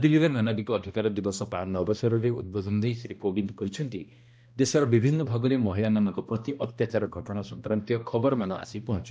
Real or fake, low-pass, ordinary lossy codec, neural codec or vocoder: fake; none; none; codec, 16 kHz, 1 kbps, X-Codec, HuBERT features, trained on balanced general audio